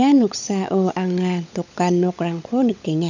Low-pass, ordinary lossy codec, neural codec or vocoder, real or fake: 7.2 kHz; none; codec, 16 kHz, 16 kbps, FunCodec, trained on LibriTTS, 50 frames a second; fake